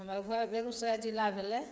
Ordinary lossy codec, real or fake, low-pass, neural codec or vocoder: none; fake; none; codec, 16 kHz, 8 kbps, FreqCodec, smaller model